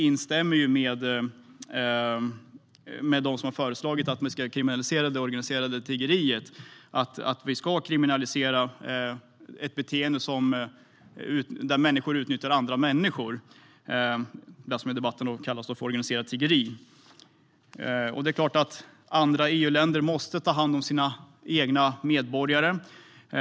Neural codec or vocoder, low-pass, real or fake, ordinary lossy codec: none; none; real; none